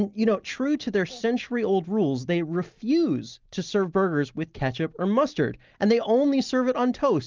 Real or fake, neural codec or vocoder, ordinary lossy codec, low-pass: real; none; Opus, 32 kbps; 7.2 kHz